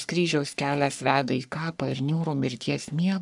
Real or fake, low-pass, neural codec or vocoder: fake; 10.8 kHz; codec, 44.1 kHz, 3.4 kbps, Pupu-Codec